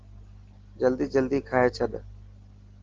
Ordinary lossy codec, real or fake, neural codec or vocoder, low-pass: Opus, 16 kbps; real; none; 7.2 kHz